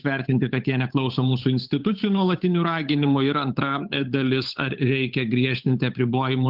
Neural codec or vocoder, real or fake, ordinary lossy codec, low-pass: codec, 16 kHz, 8 kbps, FunCodec, trained on Chinese and English, 25 frames a second; fake; Opus, 32 kbps; 5.4 kHz